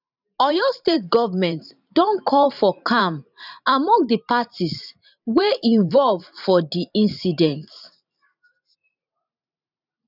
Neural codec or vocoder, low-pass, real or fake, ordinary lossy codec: vocoder, 44.1 kHz, 128 mel bands every 512 samples, BigVGAN v2; 5.4 kHz; fake; none